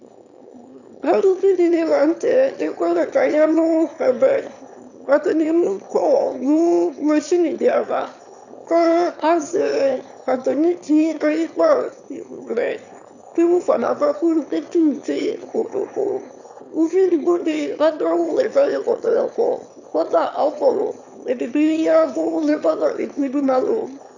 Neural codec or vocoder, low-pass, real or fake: autoencoder, 22.05 kHz, a latent of 192 numbers a frame, VITS, trained on one speaker; 7.2 kHz; fake